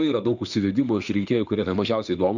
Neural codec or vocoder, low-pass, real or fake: autoencoder, 48 kHz, 32 numbers a frame, DAC-VAE, trained on Japanese speech; 7.2 kHz; fake